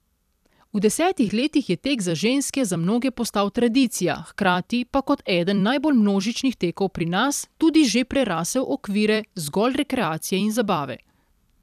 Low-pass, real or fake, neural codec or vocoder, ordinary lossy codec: 14.4 kHz; fake; vocoder, 44.1 kHz, 128 mel bands every 256 samples, BigVGAN v2; none